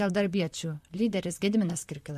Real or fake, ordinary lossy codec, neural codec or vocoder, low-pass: fake; MP3, 64 kbps; vocoder, 44.1 kHz, 128 mel bands, Pupu-Vocoder; 14.4 kHz